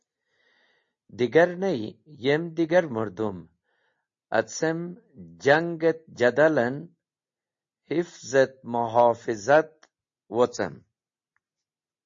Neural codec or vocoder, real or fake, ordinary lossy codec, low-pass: none; real; MP3, 32 kbps; 7.2 kHz